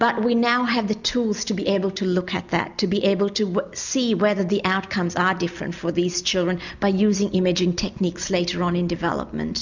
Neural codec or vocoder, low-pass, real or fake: none; 7.2 kHz; real